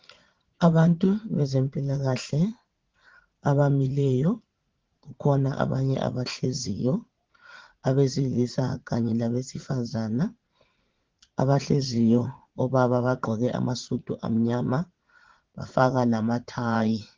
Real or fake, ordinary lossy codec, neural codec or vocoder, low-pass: fake; Opus, 16 kbps; vocoder, 44.1 kHz, 80 mel bands, Vocos; 7.2 kHz